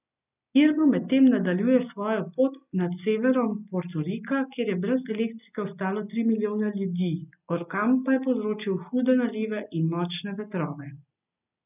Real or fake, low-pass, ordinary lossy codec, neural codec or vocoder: real; 3.6 kHz; none; none